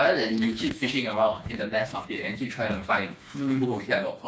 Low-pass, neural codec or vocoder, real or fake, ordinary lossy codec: none; codec, 16 kHz, 2 kbps, FreqCodec, smaller model; fake; none